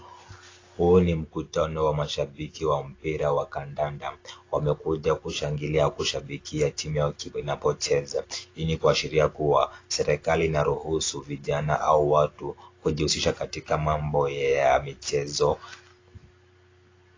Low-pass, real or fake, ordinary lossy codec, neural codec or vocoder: 7.2 kHz; real; AAC, 32 kbps; none